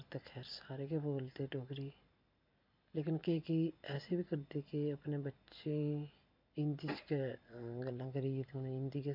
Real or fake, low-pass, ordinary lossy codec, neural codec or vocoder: real; 5.4 kHz; MP3, 48 kbps; none